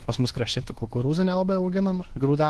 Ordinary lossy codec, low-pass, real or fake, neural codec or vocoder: Opus, 16 kbps; 10.8 kHz; fake; codec, 24 kHz, 1.2 kbps, DualCodec